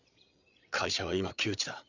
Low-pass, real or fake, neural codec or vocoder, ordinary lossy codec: 7.2 kHz; fake; codec, 16 kHz, 8 kbps, FreqCodec, larger model; none